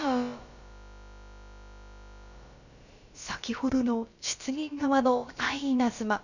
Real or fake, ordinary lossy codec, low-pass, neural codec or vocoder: fake; none; 7.2 kHz; codec, 16 kHz, about 1 kbps, DyCAST, with the encoder's durations